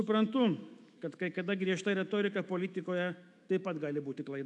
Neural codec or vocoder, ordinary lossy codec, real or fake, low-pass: autoencoder, 48 kHz, 128 numbers a frame, DAC-VAE, trained on Japanese speech; AAC, 64 kbps; fake; 10.8 kHz